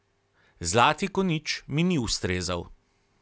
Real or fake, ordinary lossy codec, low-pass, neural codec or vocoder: real; none; none; none